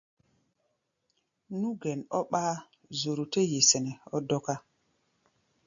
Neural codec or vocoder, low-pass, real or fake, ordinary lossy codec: none; 7.2 kHz; real; AAC, 64 kbps